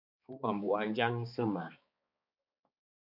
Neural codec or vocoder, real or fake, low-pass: codec, 16 kHz, 4 kbps, X-Codec, HuBERT features, trained on balanced general audio; fake; 5.4 kHz